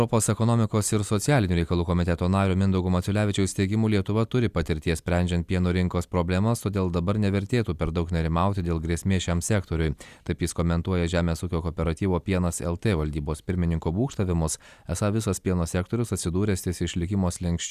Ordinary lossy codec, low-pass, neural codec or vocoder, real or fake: Opus, 64 kbps; 14.4 kHz; none; real